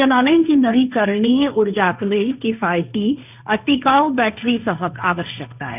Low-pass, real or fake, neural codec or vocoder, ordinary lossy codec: 3.6 kHz; fake; codec, 16 kHz, 1.1 kbps, Voila-Tokenizer; none